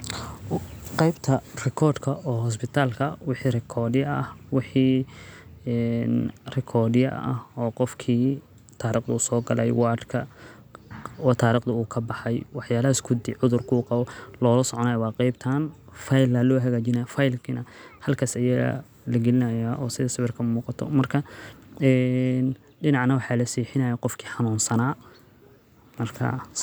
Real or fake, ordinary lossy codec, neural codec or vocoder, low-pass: real; none; none; none